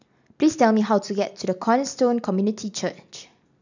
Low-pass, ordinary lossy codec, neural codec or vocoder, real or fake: 7.2 kHz; none; none; real